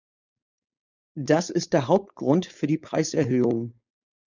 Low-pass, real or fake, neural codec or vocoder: 7.2 kHz; fake; codec, 16 kHz, 4.8 kbps, FACodec